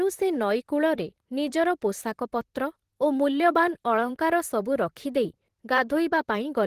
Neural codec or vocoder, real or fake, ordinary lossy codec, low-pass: vocoder, 44.1 kHz, 128 mel bands, Pupu-Vocoder; fake; Opus, 16 kbps; 14.4 kHz